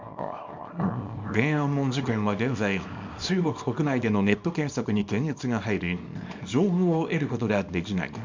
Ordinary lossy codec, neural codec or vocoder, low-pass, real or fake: MP3, 64 kbps; codec, 24 kHz, 0.9 kbps, WavTokenizer, small release; 7.2 kHz; fake